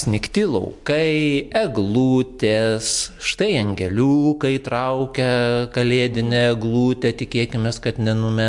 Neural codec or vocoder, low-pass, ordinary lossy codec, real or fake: none; 10.8 kHz; MP3, 64 kbps; real